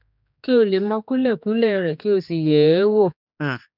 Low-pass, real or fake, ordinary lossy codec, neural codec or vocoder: 5.4 kHz; fake; none; codec, 16 kHz, 2 kbps, X-Codec, HuBERT features, trained on general audio